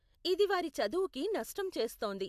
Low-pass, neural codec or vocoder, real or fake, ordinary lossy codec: 14.4 kHz; none; real; AAC, 96 kbps